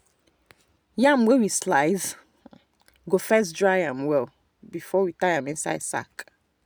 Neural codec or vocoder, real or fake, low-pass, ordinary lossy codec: none; real; none; none